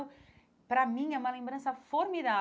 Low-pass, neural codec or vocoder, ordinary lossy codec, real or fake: none; none; none; real